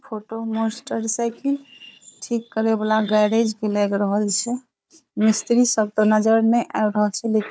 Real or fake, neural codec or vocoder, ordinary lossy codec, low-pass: fake; codec, 16 kHz, 4 kbps, FunCodec, trained on Chinese and English, 50 frames a second; none; none